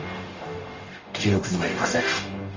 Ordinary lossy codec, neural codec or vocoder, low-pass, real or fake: Opus, 32 kbps; codec, 44.1 kHz, 0.9 kbps, DAC; 7.2 kHz; fake